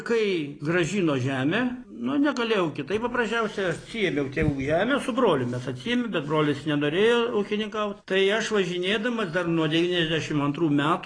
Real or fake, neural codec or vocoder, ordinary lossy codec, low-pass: real; none; AAC, 32 kbps; 9.9 kHz